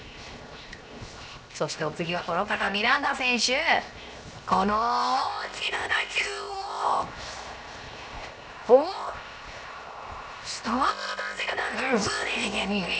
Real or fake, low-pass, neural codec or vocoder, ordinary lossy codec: fake; none; codec, 16 kHz, 0.7 kbps, FocalCodec; none